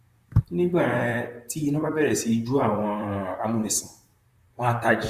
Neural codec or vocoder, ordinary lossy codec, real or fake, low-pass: vocoder, 44.1 kHz, 128 mel bands, Pupu-Vocoder; Opus, 64 kbps; fake; 14.4 kHz